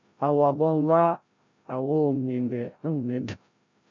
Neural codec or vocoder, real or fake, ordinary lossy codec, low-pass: codec, 16 kHz, 0.5 kbps, FreqCodec, larger model; fake; MP3, 64 kbps; 7.2 kHz